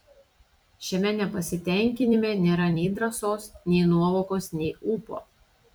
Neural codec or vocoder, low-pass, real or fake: vocoder, 44.1 kHz, 128 mel bands every 256 samples, BigVGAN v2; 19.8 kHz; fake